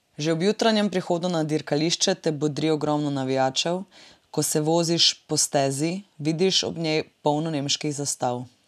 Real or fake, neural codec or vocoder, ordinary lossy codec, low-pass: real; none; none; 14.4 kHz